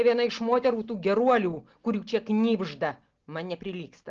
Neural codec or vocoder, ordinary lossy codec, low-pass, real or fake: none; Opus, 16 kbps; 7.2 kHz; real